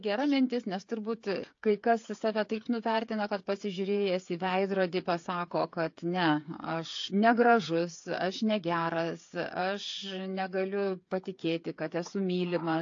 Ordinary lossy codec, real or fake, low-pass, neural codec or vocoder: AAC, 48 kbps; fake; 7.2 kHz; codec, 16 kHz, 8 kbps, FreqCodec, smaller model